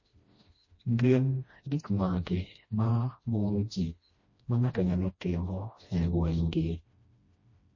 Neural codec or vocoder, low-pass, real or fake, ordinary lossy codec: codec, 16 kHz, 1 kbps, FreqCodec, smaller model; 7.2 kHz; fake; MP3, 32 kbps